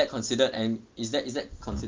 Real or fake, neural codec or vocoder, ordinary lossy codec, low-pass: real; none; Opus, 32 kbps; 7.2 kHz